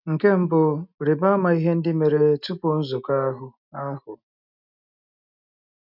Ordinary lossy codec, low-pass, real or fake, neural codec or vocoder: none; 5.4 kHz; real; none